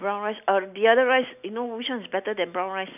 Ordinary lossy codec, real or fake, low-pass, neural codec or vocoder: none; real; 3.6 kHz; none